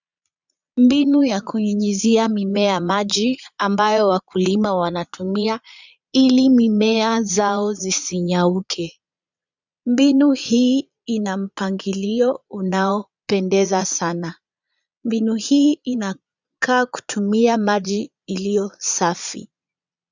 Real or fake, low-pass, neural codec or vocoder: fake; 7.2 kHz; vocoder, 22.05 kHz, 80 mel bands, Vocos